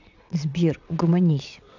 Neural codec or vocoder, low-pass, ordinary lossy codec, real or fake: vocoder, 22.05 kHz, 80 mel bands, WaveNeXt; 7.2 kHz; none; fake